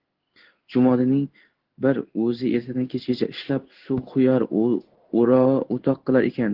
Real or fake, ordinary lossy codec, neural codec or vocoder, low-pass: fake; Opus, 16 kbps; codec, 16 kHz in and 24 kHz out, 1 kbps, XY-Tokenizer; 5.4 kHz